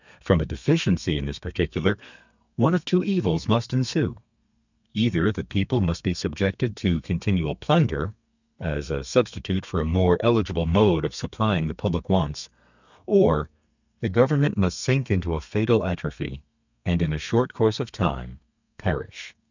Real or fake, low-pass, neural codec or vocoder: fake; 7.2 kHz; codec, 32 kHz, 1.9 kbps, SNAC